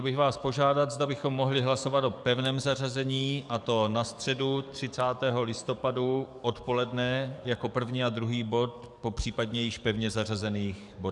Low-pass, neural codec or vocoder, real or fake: 10.8 kHz; codec, 44.1 kHz, 7.8 kbps, DAC; fake